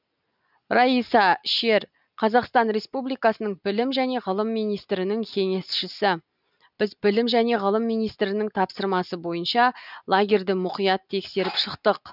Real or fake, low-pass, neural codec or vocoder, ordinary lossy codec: real; 5.4 kHz; none; none